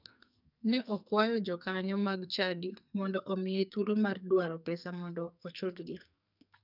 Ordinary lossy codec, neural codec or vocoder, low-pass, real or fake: none; codec, 44.1 kHz, 2.6 kbps, SNAC; 5.4 kHz; fake